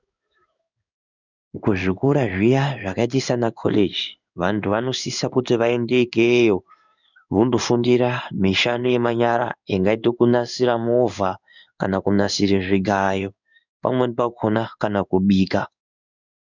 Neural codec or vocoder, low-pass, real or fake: codec, 16 kHz in and 24 kHz out, 1 kbps, XY-Tokenizer; 7.2 kHz; fake